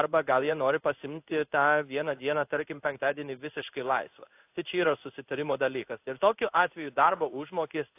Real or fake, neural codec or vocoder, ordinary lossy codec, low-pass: fake; codec, 16 kHz in and 24 kHz out, 1 kbps, XY-Tokenizer; AAC, 32 kbps; 3.6 kHz